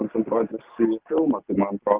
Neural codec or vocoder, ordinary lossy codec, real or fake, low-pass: none; Opus, 32 kbps; real; 3.6 kHz